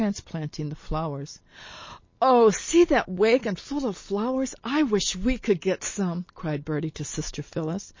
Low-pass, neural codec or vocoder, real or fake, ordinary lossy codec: 7.2 kHz; none; real; MP3, 32 kbps